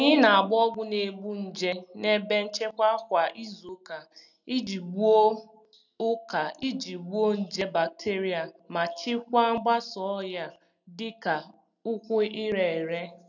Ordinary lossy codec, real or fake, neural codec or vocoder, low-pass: none; real; none; 7.2 kHz